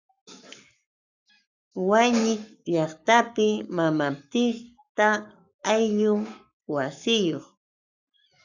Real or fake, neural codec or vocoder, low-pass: fake; codec, 44.1 kHz, 7.8 kbps, Pupu-Codec; 7.2 kHz